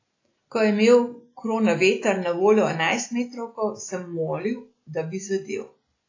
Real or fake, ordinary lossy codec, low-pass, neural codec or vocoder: real; AAC, 32 kbps; 7.2 kHz; none